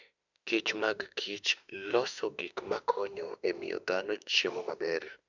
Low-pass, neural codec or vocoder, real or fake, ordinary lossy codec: 7.2 kHz; autoencoder, 48 kHz, 32 numbers a frame, DAC-VAE, trained on Japanese speech; fake; none